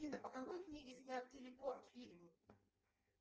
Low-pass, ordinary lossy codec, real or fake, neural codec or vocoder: 7.2 kHz; Opus, 24 kbps; fake; codec, 16 kHz in and 24 kHz out, 0.6 kbps, FireRedTTS-2 codec